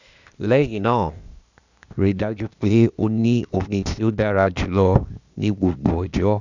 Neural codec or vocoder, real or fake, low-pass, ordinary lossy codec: codec, 16 kHz, 0.8 kbps, ZipCodec; fake; 7.2 kHz; none